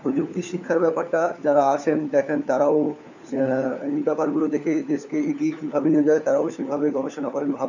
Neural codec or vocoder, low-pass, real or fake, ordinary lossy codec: codec, 16 kHz, 4 kbps, FunCodec, trained on LibriTTS, 50 frames a second; 7.2 kHz; fake; none